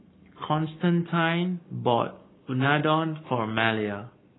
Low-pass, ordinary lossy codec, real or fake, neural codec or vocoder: 7.2 kHz; AAC, 16 kbps; fake; codec, 44.1 kHz, 7.8 kbps, Pupu-Codec